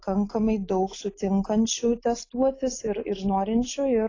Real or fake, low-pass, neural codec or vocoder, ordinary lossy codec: real; 7.2 kHz; none; AAC, 32 kbps